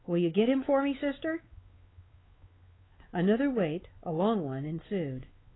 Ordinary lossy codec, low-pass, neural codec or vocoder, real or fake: AAC, 16 kbps; 7.2 kHz; codec, 16 kHz, 4 kbps, X-Codec, WavLM features, trained on Multilingual LibriSpeech; fake